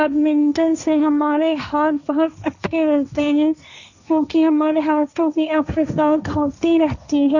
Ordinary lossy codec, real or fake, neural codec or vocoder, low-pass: none; fake; codec, 16 kHz, 1.1 kbps, Voila-Tokenizer; 7.2 kHz